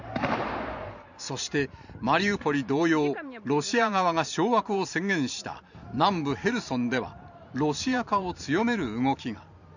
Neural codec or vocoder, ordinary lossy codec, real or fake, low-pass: vocoder, 44.1 kHz, 128 mel bands every 512 samples, BigVGAN v2; none; fake; 7.2 kHz